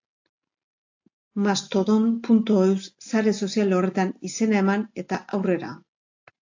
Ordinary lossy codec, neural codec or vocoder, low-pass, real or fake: AAC, 48 kbps; none; 7.2 kHz; real